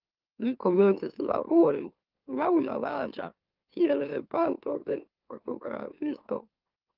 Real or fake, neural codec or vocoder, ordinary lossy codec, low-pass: fake; autoencoder, 44.1 kHz, a latent of 192 numbers a frame, MeloTTS; Opus, 24 kbps; 5.4 kHz